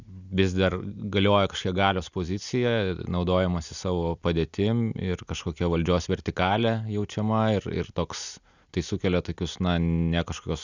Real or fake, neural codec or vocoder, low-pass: real; none; 7.2 kHz